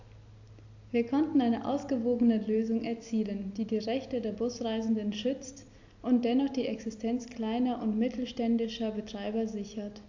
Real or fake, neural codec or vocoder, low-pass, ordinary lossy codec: real; none; 7.2 kHz; AAC, 48 kbps